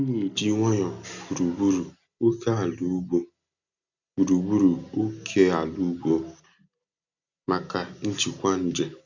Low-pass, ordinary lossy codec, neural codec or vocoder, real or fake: 7.2 kHz; none; none; real